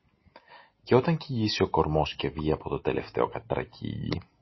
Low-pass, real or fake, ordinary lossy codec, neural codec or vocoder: 7.2 kHz; real; MP3, 24 kbps; none